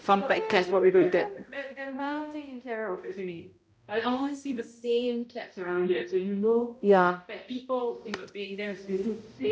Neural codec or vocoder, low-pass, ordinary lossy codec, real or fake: codec, 16 kHz, 0.5 kbps, X-Codec, HuBERT features, trained on balanced general audio; none; none; fake